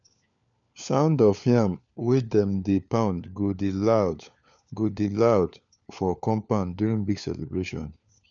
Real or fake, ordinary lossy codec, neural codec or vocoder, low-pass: fake; none; codec, 16 kHz, 4 kbps, FunCodec, trained on LibriTTS, 50 frames a second; 7.2 kHz